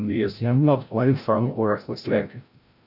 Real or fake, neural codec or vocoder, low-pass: fake; codec, 16 kHz, 0.5 kbps, FreqCodec, larger model; 5.4 kHz